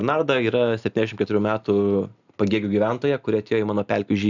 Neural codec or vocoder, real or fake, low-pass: none; real; 7.2 kHz